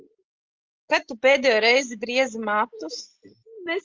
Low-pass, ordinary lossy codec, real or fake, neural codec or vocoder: 7.2 kHz; Opus, 24 kbps; real; none